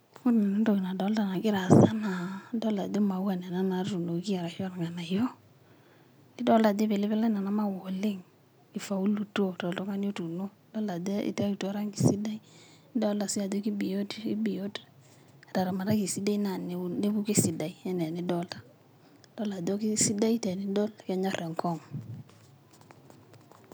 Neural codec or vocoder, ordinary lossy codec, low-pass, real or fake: none; none; none; real